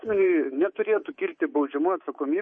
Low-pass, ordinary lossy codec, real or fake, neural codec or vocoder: 9.9 kHz; MP3, 32 kbps; fake; codec, 24 kHz, 3.1 kbps, DualCodec